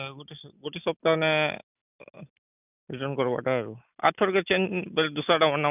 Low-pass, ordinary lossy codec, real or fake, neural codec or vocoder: 3.6 kHz; none; real; none